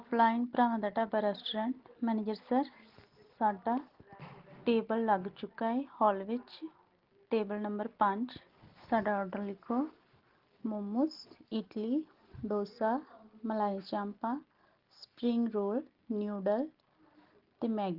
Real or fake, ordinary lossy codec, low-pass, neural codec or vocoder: real; Opus, 16 kbps; 5.4 kHz; none